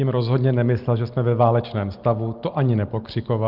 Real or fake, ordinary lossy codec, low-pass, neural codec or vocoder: real; Opus, 32 kbps; 5.4 kHz; none